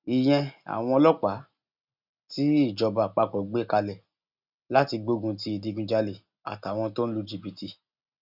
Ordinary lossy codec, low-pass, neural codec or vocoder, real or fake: none; 5.4 kHz; none; real